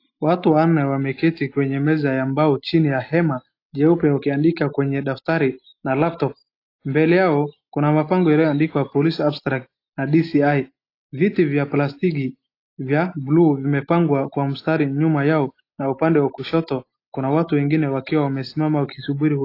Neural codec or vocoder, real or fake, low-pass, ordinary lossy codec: none; real; 5.4 kHz; AAC, 32 kbps